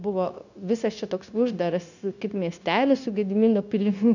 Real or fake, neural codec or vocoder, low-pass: fake; codec, 16 kHz, 0.9 kbps, LongCat-Audio-Codec; 7.2 kHz